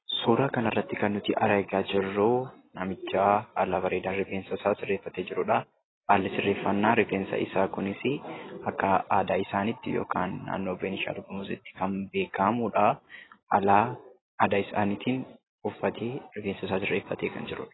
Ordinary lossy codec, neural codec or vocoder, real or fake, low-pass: AAC, 16 kbps; none; real; 7.2 kHz